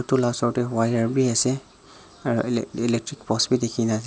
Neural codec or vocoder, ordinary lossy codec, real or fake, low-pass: none; none; real; none